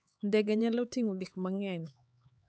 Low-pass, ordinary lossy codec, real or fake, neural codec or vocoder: none; none; fake; codec, 16 kHz, 4 kbps, X-Codec, HuBERT features, trained on LibriSpeech